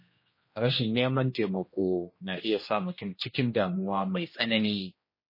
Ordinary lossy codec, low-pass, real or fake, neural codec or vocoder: MP3, 24 kbps; 5.4 kHz; fake; codec, 16 kHz, 1 kbps, X-Codec, HuBERT features, trained on general audio